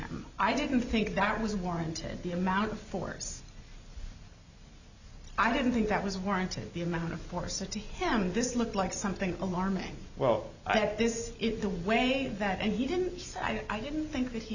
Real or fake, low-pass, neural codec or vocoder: real; 7.2 kHz; none